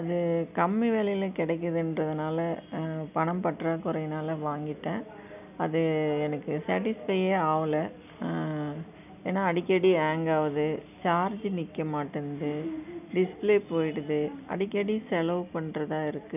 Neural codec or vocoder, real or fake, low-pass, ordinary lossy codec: none; real; 3.6 kHz; none